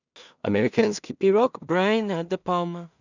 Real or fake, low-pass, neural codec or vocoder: fake; 7.2 kHz; codec, 16 kHz in and 24 kHz out, 0.4 kbps, LongCat-Audio-Codec, two codebook decoder